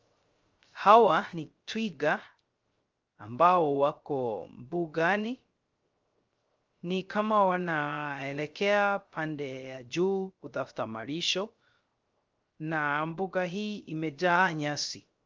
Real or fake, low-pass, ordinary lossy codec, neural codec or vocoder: fake; 7.2 kHz; Opus, 32 kbps; codec, 16 kHz, 0.3 kbps, FocalCodec